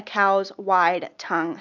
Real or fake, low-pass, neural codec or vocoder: real; 7.2 kHz; none